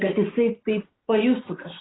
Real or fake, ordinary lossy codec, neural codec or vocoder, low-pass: real; AAC, 16 kbps; none; 7.2 kHz